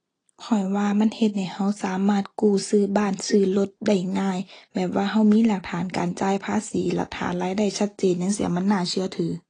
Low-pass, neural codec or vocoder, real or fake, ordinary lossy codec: 9.9 kHz; none; real; AAC, 32 kbps